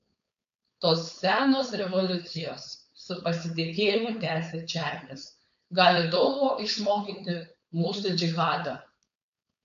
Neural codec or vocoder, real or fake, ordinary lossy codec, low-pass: codec, 16 kHz, 4.8 kbps, FACodec; fake; MP3, 64 kbps; 7.2 kHz